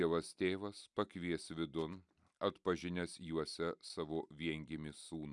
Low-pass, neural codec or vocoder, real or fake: 10.8 kHz; none; real